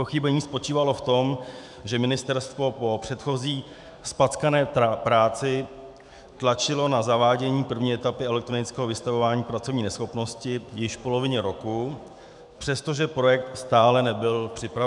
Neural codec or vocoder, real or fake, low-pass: autoencoder, 48 kHz, 128 numbers a frame, DAC-VAE, trained on Japanese speech; fake; 10.8 kHz